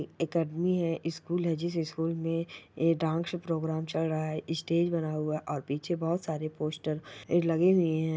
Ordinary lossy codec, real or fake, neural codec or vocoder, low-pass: none; real; none; none